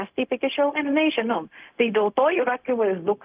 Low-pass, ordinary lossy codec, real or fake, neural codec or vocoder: 3.6 kHz; Opus, 24 kbps; fake; codec, 16 kHz, 0.4 kbps, LongCat-Audio-Codec